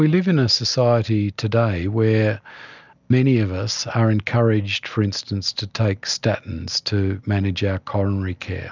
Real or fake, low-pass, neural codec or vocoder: real; 7.2 kHz; none